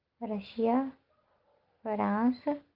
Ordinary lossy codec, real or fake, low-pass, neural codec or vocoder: Opus, 32 kbps; real; 5.4 kHz; none